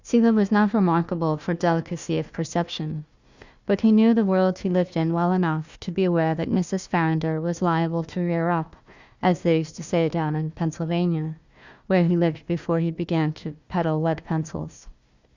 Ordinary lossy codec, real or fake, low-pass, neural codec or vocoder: Opus, 64 kbps; fake; 7.2 kHz; codec, 16 kHz, 1 kbps, FunCodec, trained on Chinese and English, 50 frames a second